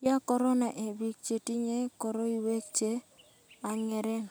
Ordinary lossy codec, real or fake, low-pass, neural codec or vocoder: none; real; none; none